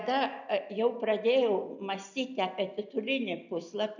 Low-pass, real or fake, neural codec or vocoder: 7.2 kHz; real; none